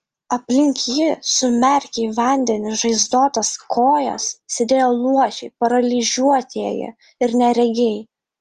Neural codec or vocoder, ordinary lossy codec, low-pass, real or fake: none; Opus, 24 kbps; 14.4 kHz; real